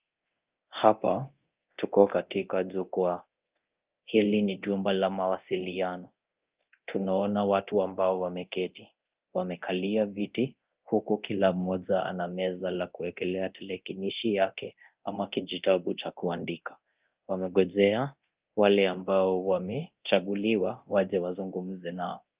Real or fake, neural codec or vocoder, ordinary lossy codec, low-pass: fake; codec, 24 kHz, 0.9 kbps, DualCodec; Opus, 32 kbps; 3.6 kHz